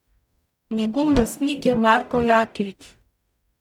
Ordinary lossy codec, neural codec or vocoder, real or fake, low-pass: none; codec, 44.1 kHz, 0.9 kbps, DAC; fake; 19.8 kHz